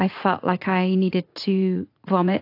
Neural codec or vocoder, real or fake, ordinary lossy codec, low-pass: vocoder, 44.1 kHz, 80 mel bands, Vocos; fake; AAC, 32 kbps; 5.4 kHz